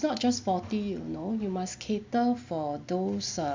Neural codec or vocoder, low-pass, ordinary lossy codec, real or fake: none; 7.2 kHz; MP3, 64 kbps; real